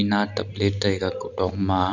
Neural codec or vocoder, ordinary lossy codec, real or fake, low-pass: none; none; real; 7.2 kHz